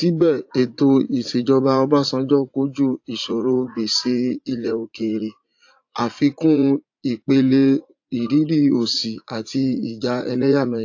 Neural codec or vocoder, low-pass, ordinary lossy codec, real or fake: vocoder, 44.1 kHz, 80 mel bands, Vocos; 7.2 kHz; AAC, 48 kbps; fake